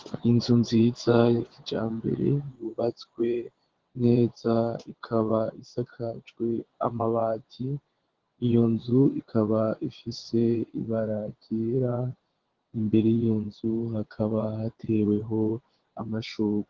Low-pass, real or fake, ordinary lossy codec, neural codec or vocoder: 7.2 kHz; fake; Opus, 16 kbps; vocoder, 22.05 kHz, 80 mel bands, WaveNeXt